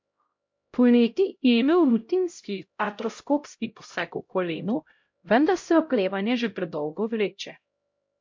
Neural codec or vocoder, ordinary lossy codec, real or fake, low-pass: codec, 16 kHz, 0.5 kbps, X-Codec, HuBERT features, trained on LibriSpeech; MP3, 48 kbps; fake; 7.2 kHz